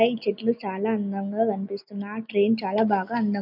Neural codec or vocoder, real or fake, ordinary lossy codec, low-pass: none; real; none; 5.4 kHz